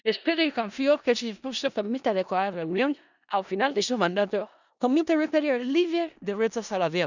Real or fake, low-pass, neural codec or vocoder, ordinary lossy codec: fake; 7.2 kHz; codec, 16 kHz in and 24 kHz out, 0.4 kbps, LongCat-Audio-Codec, four codebook decoder; none